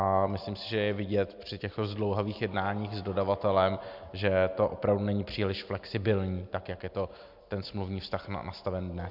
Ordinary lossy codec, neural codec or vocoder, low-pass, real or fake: MP3, 48 kbps; none; 5.4 kHz; real